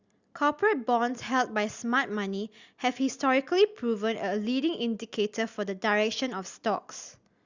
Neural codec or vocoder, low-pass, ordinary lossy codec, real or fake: none; 7.2 kHz; Opus, 64 kbps; real